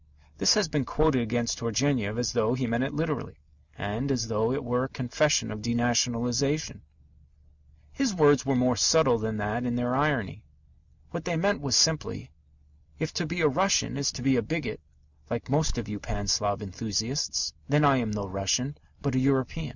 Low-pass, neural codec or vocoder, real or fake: 7.2 kHz; none; real